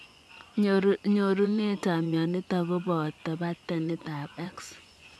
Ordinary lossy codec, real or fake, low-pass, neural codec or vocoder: none; fake; none; vocoder, 24 kHz, 100 mel bands, Vocos